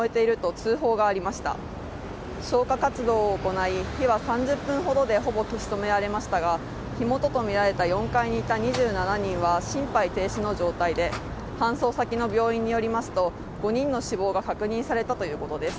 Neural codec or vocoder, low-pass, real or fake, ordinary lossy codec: none; none; real; none